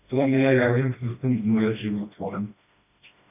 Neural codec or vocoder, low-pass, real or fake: codec, 16 kHz, 1 kbps, FreqCodec, smaller model; 3.6 kHz; fake